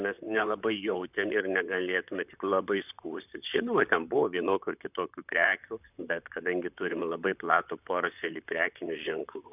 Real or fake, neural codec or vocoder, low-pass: fake; vocoder, 44.1 kHz, 128 mel bands, Pupu-Vocoder; 3.6 kHz